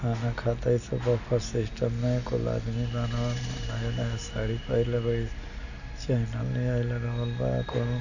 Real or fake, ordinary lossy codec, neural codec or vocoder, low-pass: real; none; none; 7.2 kHz